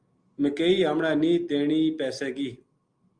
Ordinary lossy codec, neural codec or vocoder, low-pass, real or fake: Opus, 32 kbps; none; 9.9 kHz; real